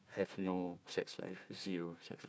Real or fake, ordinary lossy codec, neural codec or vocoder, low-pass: fake; none; codec, 16 kHz, 1 kbps, FunCodec, trained on Chinese and English, 50 frames a second; none